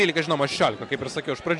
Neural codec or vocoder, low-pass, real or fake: none; 10.8 kHz; real